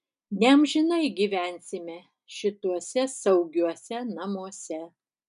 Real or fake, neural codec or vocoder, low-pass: real; none; 10.8 kHz